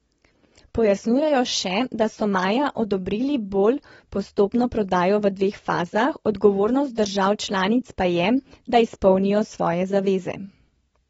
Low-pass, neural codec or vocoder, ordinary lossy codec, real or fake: 10.8 kHz; none; AAC, 24 kbps; real